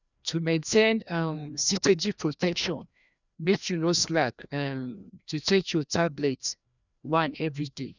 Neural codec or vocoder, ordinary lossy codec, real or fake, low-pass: codec, 16 kHz, 1 kbps, FreqCodec, larger model; none; fake; 7.2 kHz